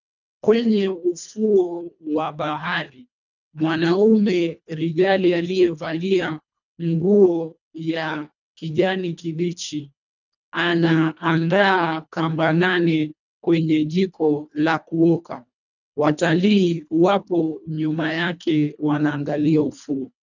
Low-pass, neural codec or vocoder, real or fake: 7.2 kHz; codec, 24 kHz, 1.5 kbps, HILCodec; fake